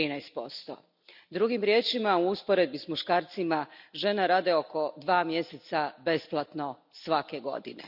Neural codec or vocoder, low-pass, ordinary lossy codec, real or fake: none; 5.4 kHz; none; real